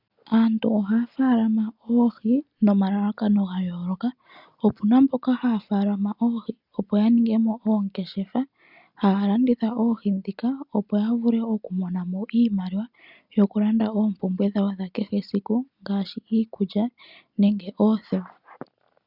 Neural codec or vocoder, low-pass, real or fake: none; 5.4 kHz; real